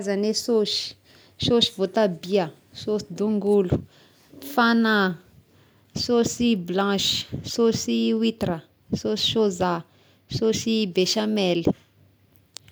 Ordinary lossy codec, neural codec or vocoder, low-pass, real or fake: none; none; none; real